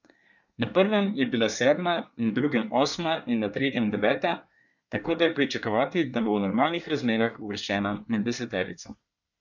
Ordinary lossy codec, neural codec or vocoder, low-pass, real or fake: none; codec, 24 kHz, 1 kbps, SNAC; 7.2 kHz; fake